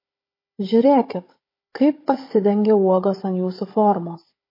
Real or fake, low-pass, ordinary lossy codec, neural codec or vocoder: fake; 5.4 kHz; MP3, 24 kbps; codec, 16 kHz, 16 kbps, FunCodec, trained on Chinese and English, 50 frames a second